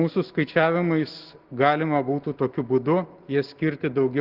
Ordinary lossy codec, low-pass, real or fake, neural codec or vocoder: Opus, 16 kbps; 5.4 kHz; real; none